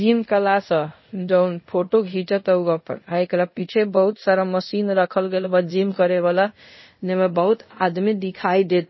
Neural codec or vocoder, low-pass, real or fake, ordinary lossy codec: codec, 24 kHz, 0.5 kbps, DualCodec; 7.2 kHz; fake; MP3, 24 kbps